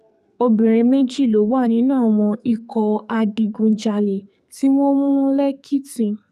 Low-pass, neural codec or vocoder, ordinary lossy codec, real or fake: 14.4 kHz; codec, 44.1 kHz, 2.6 kbps, SNAC; none; fake